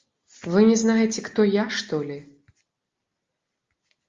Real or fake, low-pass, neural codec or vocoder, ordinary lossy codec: real; 7.2 kHz; none; Opus, 32 kbps